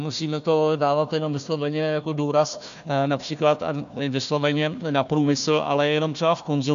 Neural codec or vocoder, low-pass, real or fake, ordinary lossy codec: codec, 16 kHz, 1 kbps, FunCodec, trained on Chinese and English, 50 frames a second; 7.2 kHz; fake; MP3, 64 kbps